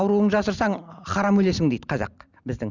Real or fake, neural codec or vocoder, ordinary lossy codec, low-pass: real; none; none; 7.2 kHz